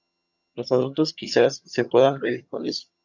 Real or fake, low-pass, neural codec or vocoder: fake; 7.2 kHz; vocoder, 22.05 kHz, 80 mel bands, HiFi-GAN